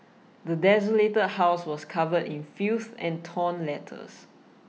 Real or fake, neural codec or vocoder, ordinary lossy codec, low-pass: real; none; none; none